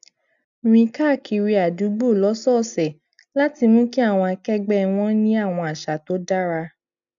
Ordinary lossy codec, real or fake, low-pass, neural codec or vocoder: none; real; 7.2 kHz; none